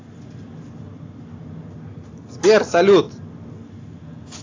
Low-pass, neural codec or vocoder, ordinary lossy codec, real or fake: 7.2 kHz; none; AAC, 32 kbps; real